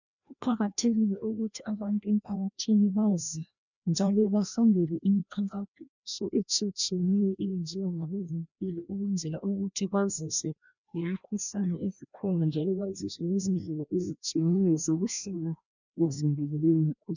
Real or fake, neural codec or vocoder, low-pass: fake; codec, 16 kHz, 1 kbps, FreqCodec, larger model; 7.2 kHz